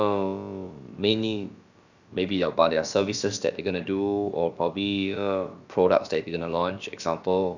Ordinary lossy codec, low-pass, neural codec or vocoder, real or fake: none; 7.2 kHz; codec, 16 kHz, about 1 kbps, DyCAST, with the encoder's durations; fake